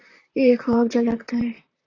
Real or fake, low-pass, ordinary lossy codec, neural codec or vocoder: fake; 7.2 kHz; AAC, 32 kbps; vocoder, 44.1 kHz, 128 mel bands, Pupu-Vocoder